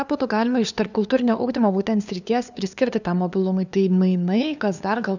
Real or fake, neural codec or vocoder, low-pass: fake; codec, 16 kHz, 2 kbps, FunCodec, trained on LibriTTS, 25 frames a second; 7.2 kHz